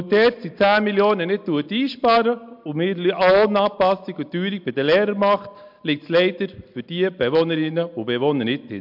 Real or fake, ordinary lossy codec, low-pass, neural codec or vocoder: real; MP3, 48 kbps; 5.4 kHz; none